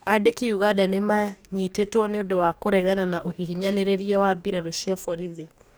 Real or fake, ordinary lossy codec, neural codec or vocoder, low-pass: fake; none; codec, 44.1 kHz, 2.6 kbps, DAC; none